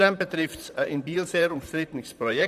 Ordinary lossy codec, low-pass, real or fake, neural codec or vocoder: none; 14.4 kHz; fake; vocoder, 44.1 kHz, 128 mel bands, Pupu-Vocoder